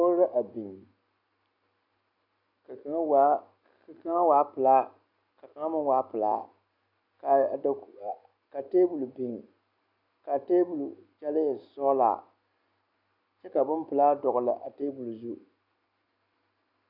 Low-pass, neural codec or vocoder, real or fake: 5.4 kHz; none; real